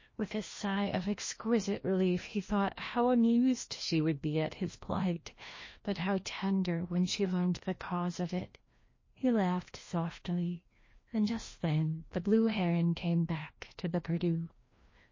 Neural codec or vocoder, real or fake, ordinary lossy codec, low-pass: codec, 16 kHz, 1 kbps, FreqCodec, larger model; fake; MP3, 32 kbps; 7.2 kHz